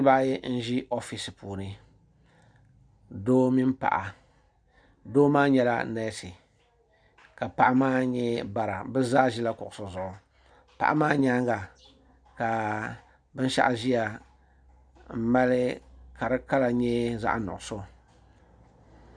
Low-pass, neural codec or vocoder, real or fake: 9.9 kHz; none; real